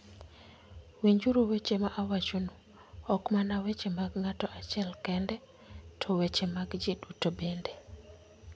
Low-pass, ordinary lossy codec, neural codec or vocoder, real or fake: none; none; none; real